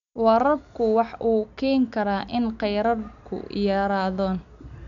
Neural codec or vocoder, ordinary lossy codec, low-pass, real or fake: none; none; 7.2 kHz; real